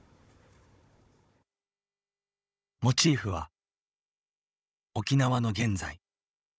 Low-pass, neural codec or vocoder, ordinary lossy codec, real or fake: none; codec, 16 kHz, 16 kbps, FunCodec, trained on Chinese and English, 50 frames a second; none; fake